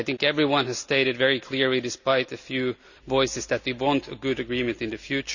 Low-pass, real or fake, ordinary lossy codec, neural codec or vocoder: 7.2 kHz; real; none; none